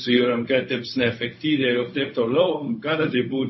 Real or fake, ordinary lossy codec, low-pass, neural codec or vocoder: fake; MP3, 24 kbps; 7.2 kHz; codec, 16 kHz, 0.4 kbps, LongCat-Audio-Codec